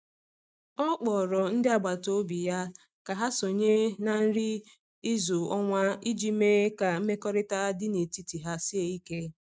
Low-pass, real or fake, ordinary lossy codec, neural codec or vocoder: none; real; none; none